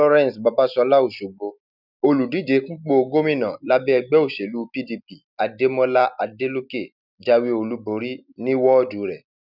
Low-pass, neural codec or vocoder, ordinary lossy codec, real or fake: 5.4 kHz; none; none; real